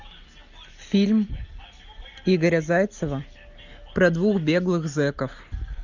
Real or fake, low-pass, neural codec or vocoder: real; 7.2 kHz; none